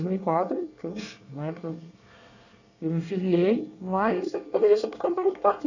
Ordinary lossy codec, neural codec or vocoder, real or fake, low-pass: none; codec, 24 kHz, 1 kbps, SNAC; fake; 7.2 kHz